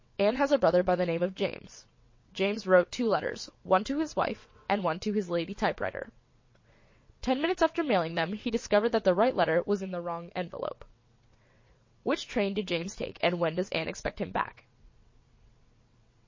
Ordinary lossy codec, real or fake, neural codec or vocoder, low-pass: MP3, 32 kbps; fake; vocoder, 22.05 kHz, 80 mel bands, WaveNeXt; 7.2 kHz